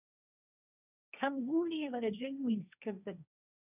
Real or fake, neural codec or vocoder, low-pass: fake; codec, 16 kHz, 1.1 kbps, Voila-Tokenizer; 3.6 kHz